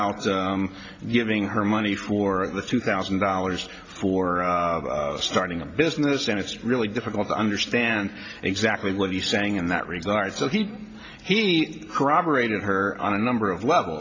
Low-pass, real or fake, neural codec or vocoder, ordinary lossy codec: 7.2 kHz; real; none; AAC, 48 kbps